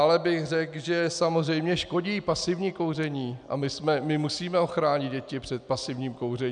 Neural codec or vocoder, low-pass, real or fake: none; 9.9 kHz; real